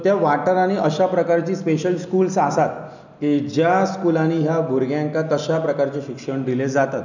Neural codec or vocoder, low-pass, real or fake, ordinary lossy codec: none; 7.2 kHz; real; none